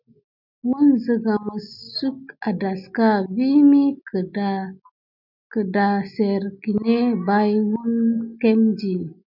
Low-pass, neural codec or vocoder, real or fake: 5.4 kHz; none; real